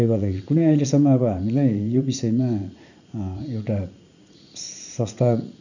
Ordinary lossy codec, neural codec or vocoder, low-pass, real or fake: none; vocoder, 44.1 kHz, 80 mel bands, Vocos; 7.2 kHz; fake